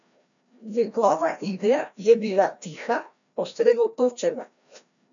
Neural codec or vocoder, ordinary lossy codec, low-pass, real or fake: codec, 16 kHz, 1 kbps, FreqCodec, larger model; none; 7.2 kHz; fake